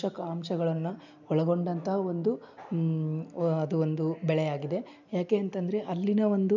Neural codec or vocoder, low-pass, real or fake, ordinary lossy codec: none; 7.2 kHz; real; none